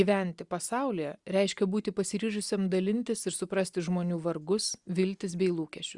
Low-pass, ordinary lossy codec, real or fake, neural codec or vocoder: 10.8 kHz; Opus, 64 kbps; real; none